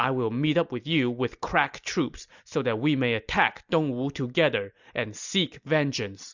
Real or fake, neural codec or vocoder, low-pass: real; none; 7.2 kHz